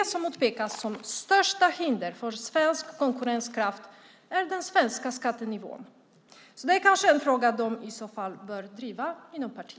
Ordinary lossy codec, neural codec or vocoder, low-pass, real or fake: none; none; none; real